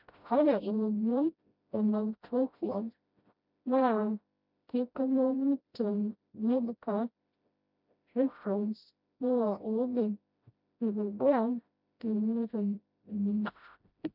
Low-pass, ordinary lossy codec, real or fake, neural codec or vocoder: 5.4 kHz; none; fake; codec, 16 kHz, 0.5 kbps, FreqCodec, smaller model